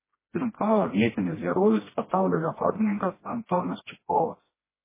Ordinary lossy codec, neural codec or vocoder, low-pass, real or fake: MP3, 16 kbps; codec, 16 kHz, 1 kbps, FreqCodec, smaller model; 3.6 kHz; fake